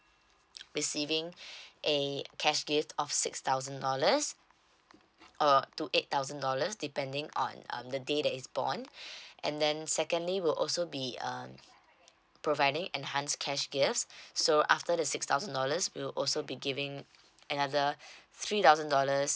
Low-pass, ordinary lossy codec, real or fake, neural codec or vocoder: none; none; real; none